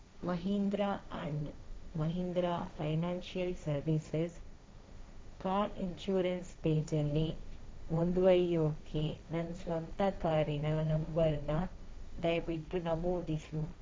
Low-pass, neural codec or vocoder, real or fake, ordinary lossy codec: none; codec, 16 kHz, 1.1 kbps, Voila-Tokenizer; fake; none